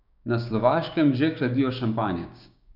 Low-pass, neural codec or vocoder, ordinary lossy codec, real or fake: 5.4 kHz; codec, 16 kHz, 6 kbps, DAC; none; fake